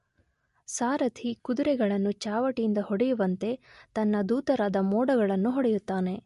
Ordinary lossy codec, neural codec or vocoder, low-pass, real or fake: MP3, 48 kbps; none; 14.4 kHz; real